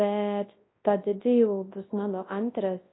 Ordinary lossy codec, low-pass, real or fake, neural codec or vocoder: AAC, 16 kbps; 7.2 kHz; fake; codec, 24 kHz, 0.9 kbps, WavTokenizer, large speech release